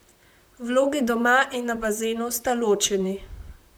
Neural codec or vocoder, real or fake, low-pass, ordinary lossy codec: vocoder, 44.1 kHz, 128 mel bands, Pupu-Vocoder; fake; none; none